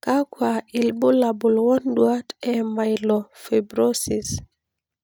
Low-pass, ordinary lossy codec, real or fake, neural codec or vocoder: none; none; real; none